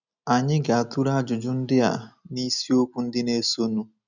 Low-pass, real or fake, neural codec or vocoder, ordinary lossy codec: 7.2 kHz; real; none; none